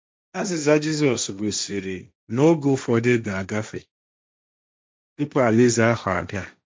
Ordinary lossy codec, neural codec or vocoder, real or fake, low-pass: none; codec, 16 kHz, 1.1 kbps, Voila-Tokenizer; fake; none